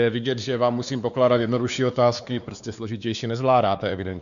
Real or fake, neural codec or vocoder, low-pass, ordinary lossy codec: fake; codec, 16 kHz, 2 kbps, X-Codec, WavLM features, trained on Multilingual LibriSpeech; 7.2 kHz; MP3, 96 kbps